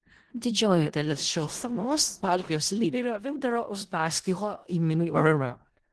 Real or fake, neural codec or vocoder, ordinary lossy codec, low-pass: fake; codec, 16 kHz in and 24 kHz out, 0.4 kbps, LongCat-Audio-Codec, four codebook decoder; Opus, 16 kbps; 10.8 kHz